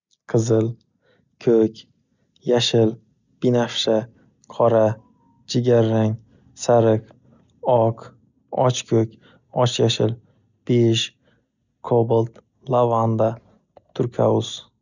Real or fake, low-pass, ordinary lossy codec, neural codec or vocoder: real; 7.2 kHz; none; none